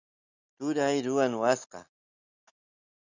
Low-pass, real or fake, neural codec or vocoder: 7.2 kHz; real; none